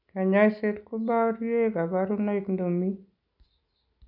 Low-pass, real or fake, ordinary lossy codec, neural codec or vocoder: 5.4 kHz; real; none; none